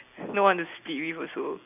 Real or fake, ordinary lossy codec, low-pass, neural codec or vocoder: real; none; 3.6 kHz; none